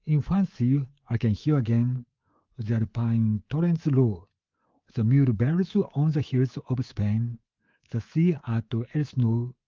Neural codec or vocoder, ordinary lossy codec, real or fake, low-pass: codec, 24 kHz, 3.1 kbps, DualCodec; Opus, 32 kbps; fake; 7.2 kHz